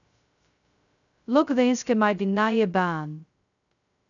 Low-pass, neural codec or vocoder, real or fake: 7.2 kHz; codec, 16 kHz, 0.2 kbps, FocalCodec; fake